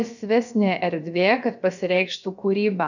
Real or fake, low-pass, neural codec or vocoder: fake; 7.2 kHz; codec, 16 kHz, about 1 kbps, DyCAST, with the encoder's durations